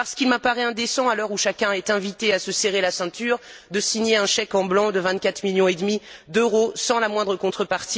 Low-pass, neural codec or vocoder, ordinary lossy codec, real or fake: none; none; none; real